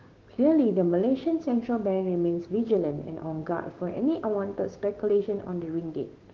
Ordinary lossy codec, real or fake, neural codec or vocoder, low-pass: Opus, 16 kbps; fake; codec, 16 kHz, 6 kbps, DAC; 7.2 kHz